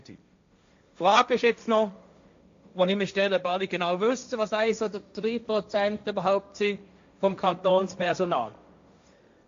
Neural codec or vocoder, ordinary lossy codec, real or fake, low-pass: codec, 16 kHz, 1.1 kbps, Voila-Tokenizer; AAC, 64 kbps; fake; 7.2 kHz